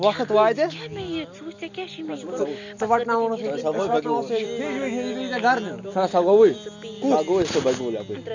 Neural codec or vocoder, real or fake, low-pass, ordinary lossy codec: none; real; 7.2 kHz; none